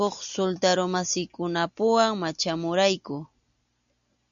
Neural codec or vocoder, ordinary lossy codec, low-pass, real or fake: none; MP3, 96 kbps; 7.2 kHz; real